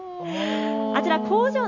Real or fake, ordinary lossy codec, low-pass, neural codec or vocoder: real; none; 7.2 kHz; none